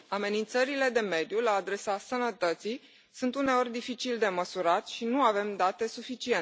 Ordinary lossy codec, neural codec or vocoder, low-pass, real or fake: none; none; none; real